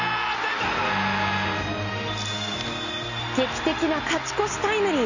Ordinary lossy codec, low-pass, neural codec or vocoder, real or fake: none; 7.2 kHz; none; real